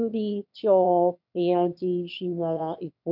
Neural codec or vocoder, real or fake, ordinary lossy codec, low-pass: autoencoder, 22.05 kHz, a latent of 192 numbers a frame, VITS, trained on one speaker; fake; none; 5.4 kHz